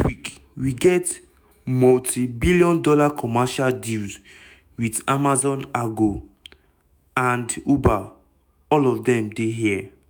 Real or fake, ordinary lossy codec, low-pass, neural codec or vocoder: fake; none; none; autoencoder, 48 kHz, 128 numbers a frame, DAC-VAE, trained on Japanese speech